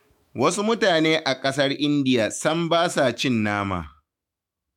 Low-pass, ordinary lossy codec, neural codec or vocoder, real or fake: 19.8 kHz; MP3, 96 kbps; autoencoder, 48 kHz, 128 numbers a frame, DAC-VAE, trained on Japanese speech; fake